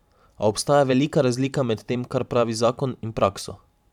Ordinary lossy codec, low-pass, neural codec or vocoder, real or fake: none; 19.8 kHz; vocoder, 44.1 kHz, 128 mel bands every 512 samples, BigVGAN v2; fake